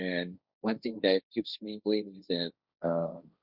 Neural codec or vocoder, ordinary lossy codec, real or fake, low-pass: codec, 16 kHz, 1.1 kbps, Voila-Tokenizer; Opus, 64 kbps; fake; 5.4 kHz